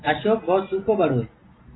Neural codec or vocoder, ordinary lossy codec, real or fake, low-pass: none; AAC, 16 kbps; real; 7.2 kHz